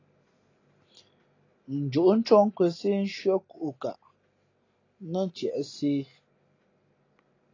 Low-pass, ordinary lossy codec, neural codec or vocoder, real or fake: 7.2 kHz; AAC, 32 kbps; none; real